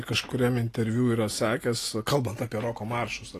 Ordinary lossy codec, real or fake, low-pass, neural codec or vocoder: AAC, 48 kbps; real; 14.4 kHz; none